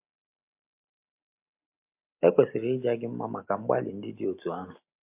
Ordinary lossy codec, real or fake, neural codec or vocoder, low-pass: MP3, 32 kbps; real; none; 3.6 kHz